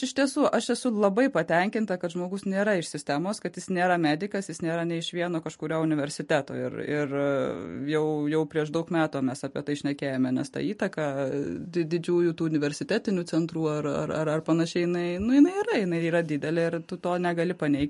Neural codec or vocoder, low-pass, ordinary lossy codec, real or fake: none; 14.4 kHz; MP3, 48 kbps; real